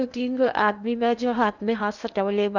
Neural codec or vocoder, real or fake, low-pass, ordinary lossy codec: codec, 16 kHz in and 24 kHz out, 0.8 kbps, FocalCodec, streaming, 65536 codes; fake; 7.2 kHz; none